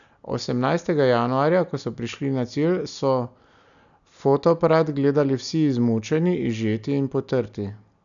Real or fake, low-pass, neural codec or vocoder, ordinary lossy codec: real; 7.2 kHz; none; none